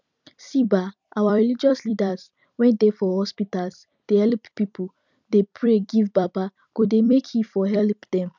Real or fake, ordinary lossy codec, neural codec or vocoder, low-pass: fake; none; vocoder, 44.1 kHz, 128 mel bands every 256 samples, BigVGAN v2; 7.2 kHz